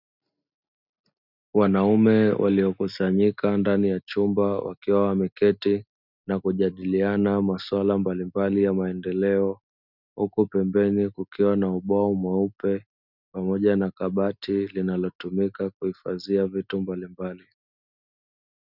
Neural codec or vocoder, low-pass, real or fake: none; 5.4 kHz; real